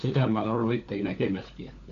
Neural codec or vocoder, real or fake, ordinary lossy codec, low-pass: codec, 16 kHz, 4 kbps, FunCodec, trained on LibriTTS, 50 frames a second; fake; none; 7.2 kHz